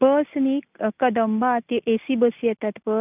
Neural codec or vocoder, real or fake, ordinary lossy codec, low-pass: none; real; none; 3.6 kHz